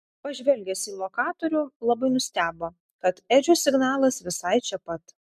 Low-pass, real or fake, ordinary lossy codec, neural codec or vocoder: 14.4 kHz; real; MP3, 96 kbps; none